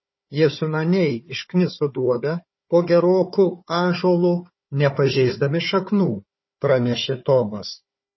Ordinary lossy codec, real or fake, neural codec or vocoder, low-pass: MP3, 24 kbps; fake; codec, 16 kHz, 4 kbps, FunCodec, trained on Chinese and English, 50 frames a second; 7.2 kHz